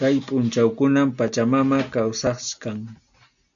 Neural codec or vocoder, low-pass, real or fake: none; 7.2 kHz; real